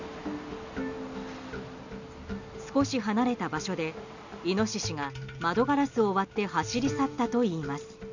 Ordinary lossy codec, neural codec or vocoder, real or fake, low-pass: none; none; real; 7.2 kHz